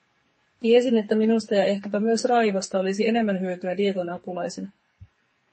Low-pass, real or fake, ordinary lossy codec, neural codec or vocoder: 10.8 kHz; fake; MP3, 32 kbps; codec, 44.1 kHz, 2.6 kbps, SNAC